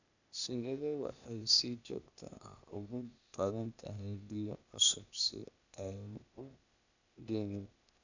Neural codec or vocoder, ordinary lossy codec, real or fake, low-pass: codec, 16 kHz, 0.8 kbps, ZipCodec; none; fake; 7.2 kHz